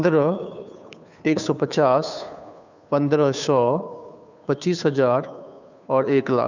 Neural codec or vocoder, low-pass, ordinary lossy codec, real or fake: codec, 16 kHz, 2 kbps, FunCodec, trained on Chinese and English, 25 frames a second; 7.2 kHz; none; fake